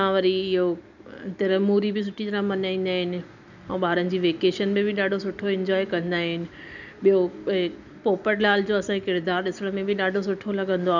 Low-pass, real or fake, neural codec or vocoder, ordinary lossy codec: 7.2 kHz; real; none; none